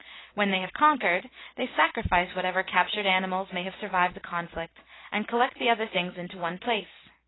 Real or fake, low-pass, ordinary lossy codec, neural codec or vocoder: real; 7.2 kHz; AAC, 16 kbps; none